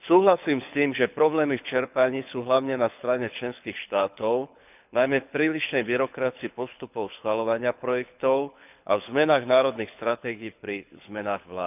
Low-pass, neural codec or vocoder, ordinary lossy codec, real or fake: 3.6 kHz; codec, 24 kHz, 6 kbps, HILCodec; none; fake